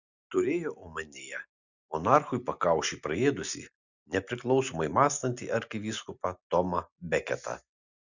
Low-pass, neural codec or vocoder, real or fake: 7.2 kHz; none; real